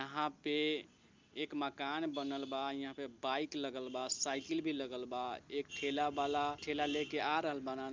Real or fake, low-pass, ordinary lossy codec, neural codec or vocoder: real; 7.2 kHz; Opus, 24 kbps; none